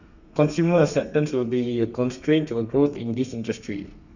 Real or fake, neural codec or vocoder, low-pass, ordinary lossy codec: fake; codec, 32 kHz, 1.9 kbps, SNAC; 7.2 kHz; none